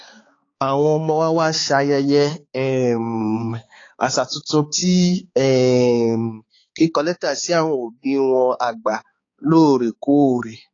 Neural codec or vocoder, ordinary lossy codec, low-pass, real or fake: codec, 16 kHz, 4 kbps, X-Codec, HuBERT features, trained on balanced general audio; AAC, 32 kbps; 7.2 kHz; fake